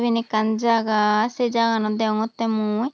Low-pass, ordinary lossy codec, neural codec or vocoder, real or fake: none; none; none; real